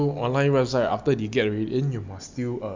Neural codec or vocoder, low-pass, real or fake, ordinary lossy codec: none; 7.2 kHz; real; MP3, 64 kbps